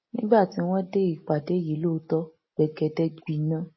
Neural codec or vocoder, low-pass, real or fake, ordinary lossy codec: none; 7.2 kHz; real; MP3, 24 kbps